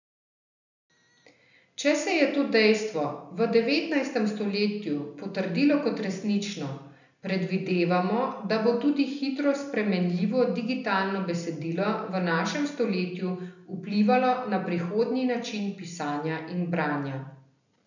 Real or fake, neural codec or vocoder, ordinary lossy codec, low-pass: real; none; none; 7.2 kHz